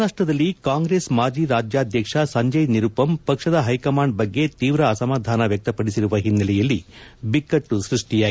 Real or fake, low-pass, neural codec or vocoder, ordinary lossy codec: real; none; none; none